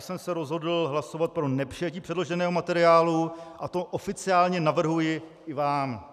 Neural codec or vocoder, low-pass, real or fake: none; 14.4 kHz; real